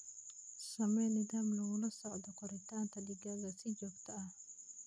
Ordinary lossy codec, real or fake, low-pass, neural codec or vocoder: none; real; none; none